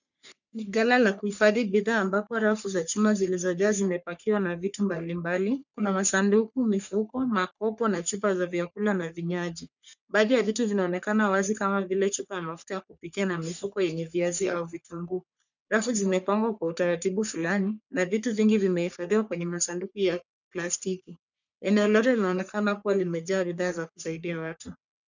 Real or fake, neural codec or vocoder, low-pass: fake; codec, 44.1 kHz, 3.4 kbps, Pupu-Codec; 7.2 kHz